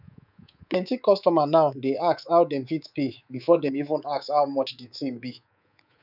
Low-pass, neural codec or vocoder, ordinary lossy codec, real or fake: 5.4 kHz; codec, 24 kHz, 3.1 kbps, DualCodec; none; fake